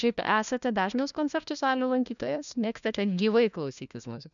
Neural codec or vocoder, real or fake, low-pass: codec, 16 kHz, 1 kbps, FunCodec, trained on LibriTTS, 50 frames a second; fake; 7.2 kHz